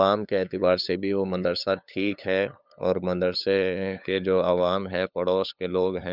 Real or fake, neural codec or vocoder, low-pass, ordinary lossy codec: fake; codec, 16 kHz, 8 kbps, FunCodec, trained on LibriTTS, 25 frames a second; 5.4 kHz; none